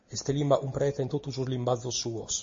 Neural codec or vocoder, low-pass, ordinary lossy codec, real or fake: none; 7.2 kHz; MP3, 32 kbps; real